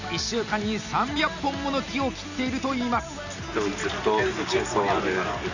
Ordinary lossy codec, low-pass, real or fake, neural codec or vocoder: none; 7.2 kHz; real; none